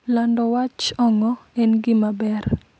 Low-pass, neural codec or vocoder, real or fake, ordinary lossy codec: none; none; real; none